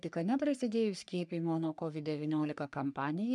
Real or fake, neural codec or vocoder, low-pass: fake; codec, 44.1 kHz, 3.4 kbps, Pupu-Codec; 10.8 kHz